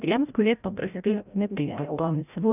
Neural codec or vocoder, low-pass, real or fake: codec, 16 kHz, 0.5 kbps, FreqCodec, larger model; 3.6 kHz; fake